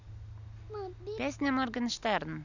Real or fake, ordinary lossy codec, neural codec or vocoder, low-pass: real; none; none; 7.2 kHz